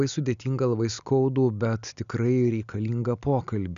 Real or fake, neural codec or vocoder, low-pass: real; none; 7.2 kHz